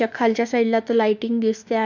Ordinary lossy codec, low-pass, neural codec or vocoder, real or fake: none; 7.2 kHz; autoencoder, 48 kHz, 32 numbers a frame, DAC-VAE, trained on Japanese speech; fake